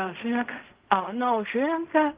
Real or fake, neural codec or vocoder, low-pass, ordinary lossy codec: fake; codec, 16 kHz in and 24 kHz out, 0.4 kbps, LongCat-Audio-Codec, fine tuned four codebook decoder; 3.6 kHz; Opus, 32 kbps